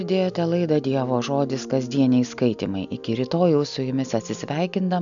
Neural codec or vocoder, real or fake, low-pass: none; real; 7.2 kHz